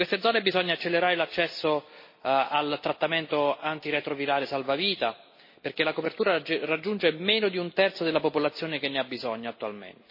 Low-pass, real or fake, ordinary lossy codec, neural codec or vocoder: 5.4 kHz; real; MP3, 24 kbps; none